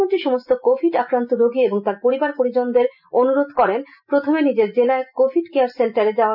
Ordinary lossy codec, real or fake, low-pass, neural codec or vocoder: none; real; 5.4 kHz; none